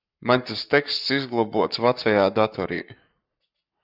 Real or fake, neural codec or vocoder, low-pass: fake; codec, 44.1 kHz, 7.8 kbps, Pupu-Codec; 5.4 kHz